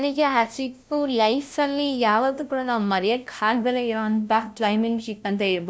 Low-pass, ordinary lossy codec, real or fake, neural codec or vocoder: none; none; fake; codec, 16 kHz, 0.5 kbps, FunCodec, trained on LibriTTS, 25 frames a second